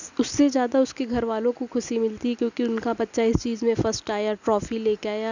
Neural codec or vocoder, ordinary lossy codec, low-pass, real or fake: none; none; 7.2 kHz; real